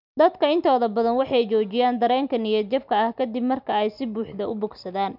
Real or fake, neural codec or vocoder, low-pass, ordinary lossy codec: real; none; 5.4 kHz; none